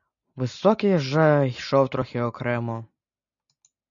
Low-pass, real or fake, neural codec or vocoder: 7.2 kHz; real; none